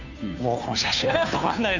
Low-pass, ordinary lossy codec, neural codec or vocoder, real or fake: 7.2 kHz; none; codec, 16 kHz, 2 kbps, FunCodec, trained on Chinese and English, 25 frames a second; fake